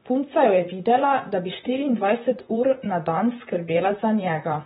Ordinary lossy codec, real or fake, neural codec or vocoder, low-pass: AAC, 16 kbps; fake; vocoder, 44.1 kHz, 128 mel bands, Pupu-Vocoder; 19.8 kHz